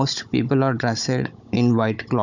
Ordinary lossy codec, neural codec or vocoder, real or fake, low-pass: none; codec, 16 kHz, 16 kbps, FunCodec, trained on Chinese and English, 50 frames a second; fake; 7.2 kHz